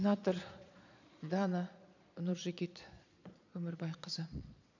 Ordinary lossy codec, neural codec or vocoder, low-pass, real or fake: none; vocoder, 22.05 kHz, 80 mel bands, Vocos; 7.2 kHz; fake